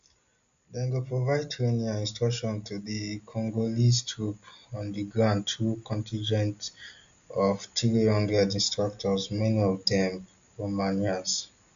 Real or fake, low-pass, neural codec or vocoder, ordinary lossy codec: real; 7.2 kHz; none; MP3, 64 kbps